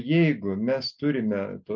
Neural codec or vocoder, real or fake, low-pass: none; real; 7.2 kHz